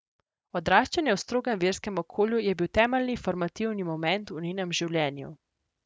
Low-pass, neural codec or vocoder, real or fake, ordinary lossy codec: none; none; real; none